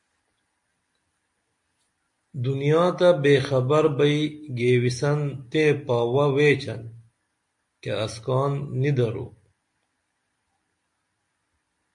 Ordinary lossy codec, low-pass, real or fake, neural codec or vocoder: MP3, 48 kbps; 10.8 kHz; real; none